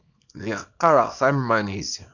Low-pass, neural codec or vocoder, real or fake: 7.2 kHz; codec, 24 kHz, 0.9 kbps, WavTokenizer, small release; fake